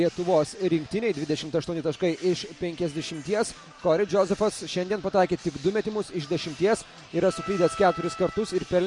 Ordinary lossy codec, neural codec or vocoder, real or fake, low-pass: MP3, 48 kbps; none; real; 10.8 kHz